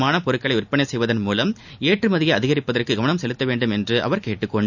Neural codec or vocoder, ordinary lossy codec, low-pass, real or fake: none; none; 7.2 kHz; real